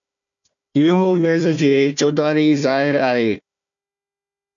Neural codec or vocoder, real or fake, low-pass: codec, 16 kHz, 1 kbps, FunCodec, trained on Chinese and English, 50 frames a second; fake; 7.2 kHz